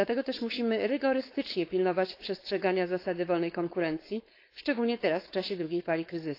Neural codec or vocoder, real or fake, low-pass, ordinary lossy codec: codec, 16 kHz, 4.8 kbps, FACodec; fake; 5.4 kHz; AAC, 32 kbps